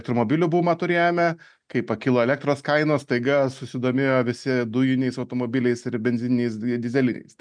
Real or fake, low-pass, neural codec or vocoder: real; 9.9 kHz; none